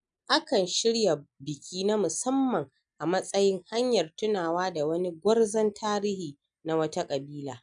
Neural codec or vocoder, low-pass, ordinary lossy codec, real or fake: none; 9.9 kHz; none; real